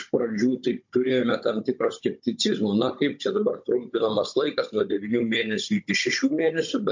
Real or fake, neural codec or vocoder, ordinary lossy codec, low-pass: fake; codec, 16 kHz, 16 kbps, FunCodec, trained on Chinese and English, 50 frames a second; MP3, 48 kbps; 7.2 kHz